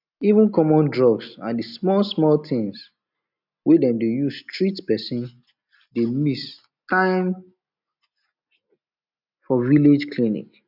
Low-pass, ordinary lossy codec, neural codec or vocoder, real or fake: 5.4 kHz; none; none; real